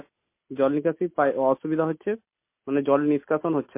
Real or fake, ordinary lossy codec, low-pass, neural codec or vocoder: real; MP3, 24 kbps; 3.6 kHz; none